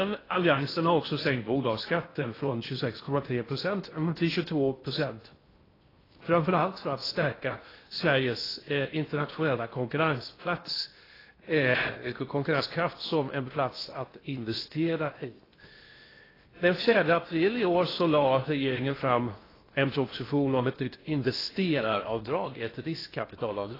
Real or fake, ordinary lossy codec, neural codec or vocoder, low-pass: fake; AAC, 24 kbps; codec, 16 kHz in and 24 kHz out, 0.6 kbps, FocalCodec, streaming, 2048 codes; 5.4 kHz